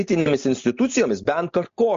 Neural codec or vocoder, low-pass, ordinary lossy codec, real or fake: none; 7.2 kHz; MP3, 48 kbps; real